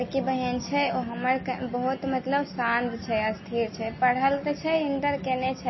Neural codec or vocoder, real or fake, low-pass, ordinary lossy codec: none; real; 7.2 kHz; MP3, 24 kbps